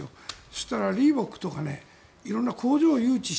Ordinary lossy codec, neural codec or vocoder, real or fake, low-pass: none; none; real; none